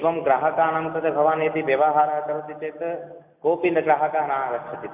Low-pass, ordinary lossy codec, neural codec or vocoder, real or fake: 3.6 kHz; AAC, 32 kbps; none; real